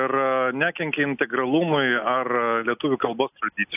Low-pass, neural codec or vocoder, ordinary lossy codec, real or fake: 3.6 kHz; none; AAC, 32 kbps; real